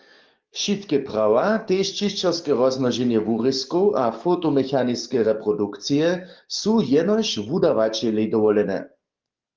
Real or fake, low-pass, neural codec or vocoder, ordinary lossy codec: fake; 7.2 kHz; codec, 44.1 kHz, 7.8 kbps, DAC; Opus, 24 kbps